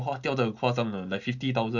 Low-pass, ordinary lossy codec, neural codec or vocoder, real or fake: 7.2 kHz; none; none; real